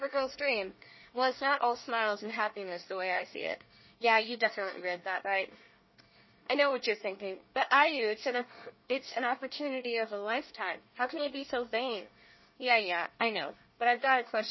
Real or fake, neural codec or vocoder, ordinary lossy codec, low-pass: fake; codec, 24 kHz, 1 kbps, SNAC; MP3, 24 kbps; 7.2 kHz